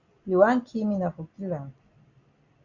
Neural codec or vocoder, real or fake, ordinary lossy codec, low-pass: none; real; Opus, 64 kbps; 7.2 kHz